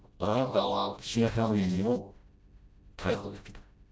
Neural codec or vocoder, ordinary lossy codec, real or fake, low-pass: codec, 16 kHz, 0.5 kbps, FreqCodec, smaller model; none; fake; none